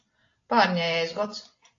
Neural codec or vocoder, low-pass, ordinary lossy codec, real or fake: none; 7.2 kHz; AAC, 32 kbps; real